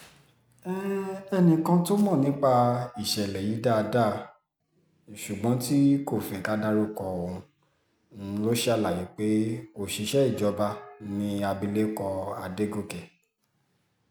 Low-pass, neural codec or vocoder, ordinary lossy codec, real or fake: none; none; none; real